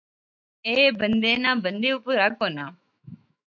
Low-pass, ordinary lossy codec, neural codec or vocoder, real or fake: 7.2 kHz; MP3, 64 kbps; autoencoder, 48 kHz, 128 numbers a frame, DAC-VAE, trained on Japanese speech; fake